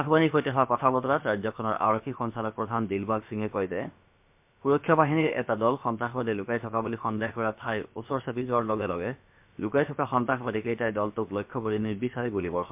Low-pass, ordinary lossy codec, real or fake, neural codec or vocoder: 3.6 kHz; MP3, 32 kbps; fake; codec, 16 kHz, about 1 kbps, DyCAST, with the encoder's durations